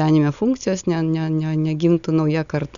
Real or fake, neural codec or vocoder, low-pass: real; none; 7.2 kHz